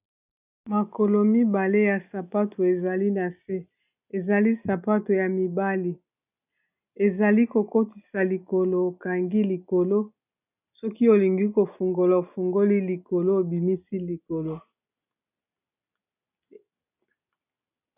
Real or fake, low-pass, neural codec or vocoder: real; 3.6 kHz; none